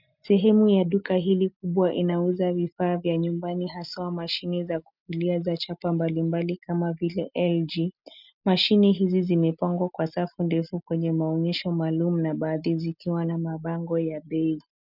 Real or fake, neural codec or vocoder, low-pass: real; none; 5.4 kHz